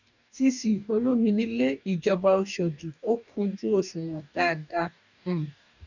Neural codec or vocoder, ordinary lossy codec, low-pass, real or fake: codec, 44.1 kHz, 2.6 kbps, DAC; none; 7.2 kHz; fake